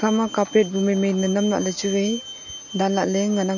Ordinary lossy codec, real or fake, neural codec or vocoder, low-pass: none; real; none; 7.2 kHz